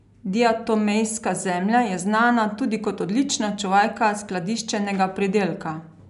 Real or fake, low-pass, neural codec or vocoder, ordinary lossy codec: real; 10.8 kHz; none; none